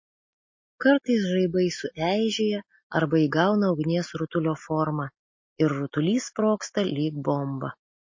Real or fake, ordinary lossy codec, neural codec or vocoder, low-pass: real; MP3, 32 kbps; none; 7.2 kHz